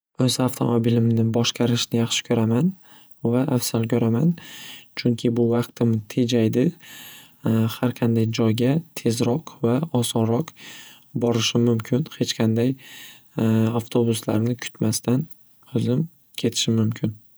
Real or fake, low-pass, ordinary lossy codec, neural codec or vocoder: fake; none; none; vocoder, 48 kHz, 128 mel bands, Vocos